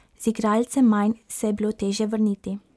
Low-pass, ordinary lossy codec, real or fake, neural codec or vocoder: none; none; real; none